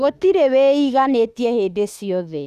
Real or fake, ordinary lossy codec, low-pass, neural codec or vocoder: fake; none; 14.4 kHz; autoencoder, 48 kHz, 32 numbers a frame, DAC-VAE, trained on Japanese speech